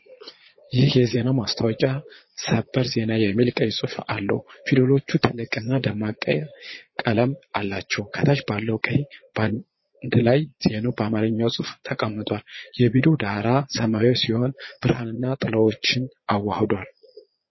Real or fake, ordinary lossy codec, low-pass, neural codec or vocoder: fake; MP3, 24 kbps; 7.2 kHz; vocoder, 22.05 kHz, 80 mel bands, Vocos